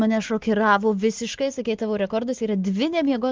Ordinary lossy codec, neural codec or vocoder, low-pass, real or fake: Opus, 16 kbps; none; 7.2 kHz; real